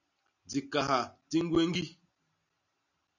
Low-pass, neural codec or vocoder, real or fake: 7.2 kHz; none; real